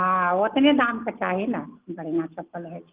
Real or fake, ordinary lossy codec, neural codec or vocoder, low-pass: real; Opus, 32 kbps; none; 3.6 kHz